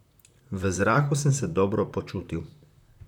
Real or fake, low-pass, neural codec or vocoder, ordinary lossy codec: fake; 19.8 kHz; vocoder, 44.1 kHz, 128 mel bands, Pupu-Vocoder; none